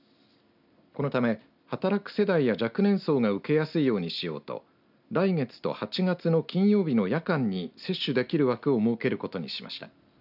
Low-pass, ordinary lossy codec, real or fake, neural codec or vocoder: 5.4 kHz; none; real; none